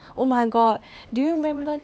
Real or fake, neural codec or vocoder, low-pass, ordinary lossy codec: fake; codec, 16 kHz, 2 kbps, X-Codec, HuBERT features, trained on LibriSpeech; none; none